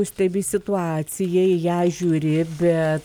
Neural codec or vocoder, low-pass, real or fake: none; 19.8 kHz; real